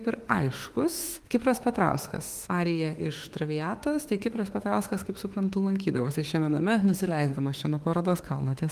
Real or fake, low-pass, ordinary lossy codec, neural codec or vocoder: fake; 14.4 kHz; Opus, 24 kbps; autoencoder, 48 kHz, 32 numbers a frame, DAC-VAE, trained on Japanese speech